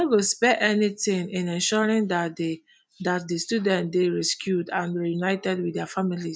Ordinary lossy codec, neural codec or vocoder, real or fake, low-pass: none; none; real; none